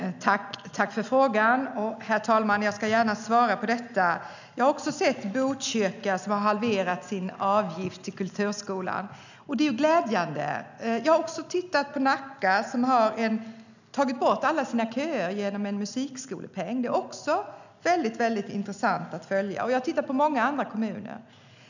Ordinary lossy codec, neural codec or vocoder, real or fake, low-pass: none; none; real; 7.2 kHz